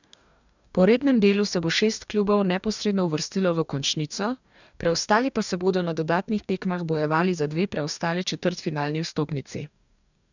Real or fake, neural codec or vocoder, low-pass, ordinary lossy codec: fake; codec, 44.1 kHz, 2.6 kbps, DAC; 7.2 kHz; none